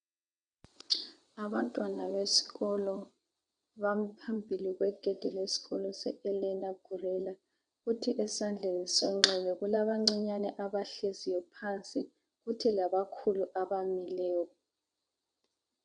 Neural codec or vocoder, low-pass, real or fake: vocoder, 22.05 kHz, 80 mel bands, WaveNeXt; 9.9 kHz; fake